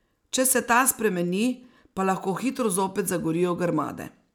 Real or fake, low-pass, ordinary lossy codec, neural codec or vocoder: real; none; none; none